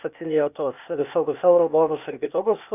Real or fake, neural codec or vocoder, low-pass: fake; codec, 16 kHz, 0.8 kbps, ZipCodec; 3.6 kHz